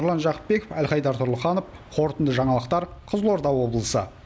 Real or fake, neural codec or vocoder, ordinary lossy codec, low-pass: real; none; none; none